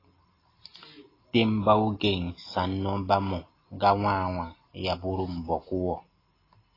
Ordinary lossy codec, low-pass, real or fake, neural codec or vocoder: AAC, 24 kbps; 5.4 kHz; real; none